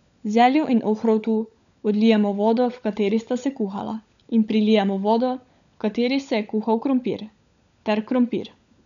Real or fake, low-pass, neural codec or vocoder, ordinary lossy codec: fake; 7.2 kHz; codec, 16 kHz, 16 kbps, FunCodec, trained on LibriTTS, 50 frames a second; none